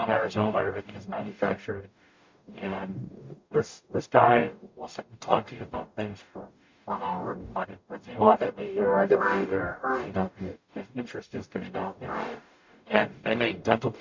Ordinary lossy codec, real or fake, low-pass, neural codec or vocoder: MP3, 48 kbps; fake; 7.2 kHz; codec, 44.1 kHz, 0.9 kbps, DAC